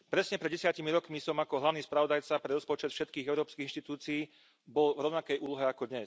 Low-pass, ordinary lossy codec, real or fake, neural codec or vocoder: none; none; real; none